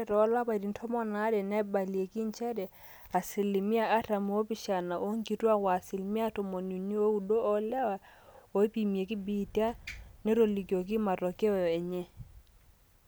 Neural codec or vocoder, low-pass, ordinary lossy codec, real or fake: none; none; none; real